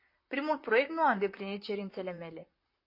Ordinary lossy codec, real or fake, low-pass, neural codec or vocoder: MP3, 32 kbps; fake; 5.4 kHz; vocoder, 44.1 kHz, 128 mel bands, Pupu-Vocoder